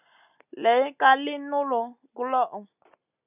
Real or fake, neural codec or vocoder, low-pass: real; none; 3.6 kHz